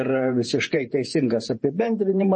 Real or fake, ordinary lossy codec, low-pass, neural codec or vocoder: fake; MP3, 32 kbps; 10.8 kHz; vocoder, 48 kHz, 128 mel bands, Vocos